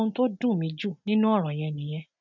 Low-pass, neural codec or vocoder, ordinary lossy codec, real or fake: 7.2 kHz; none; none; real